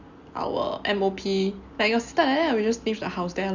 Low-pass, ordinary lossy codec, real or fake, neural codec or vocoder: 7.2 kHz; Opus, 64 kbps; real; none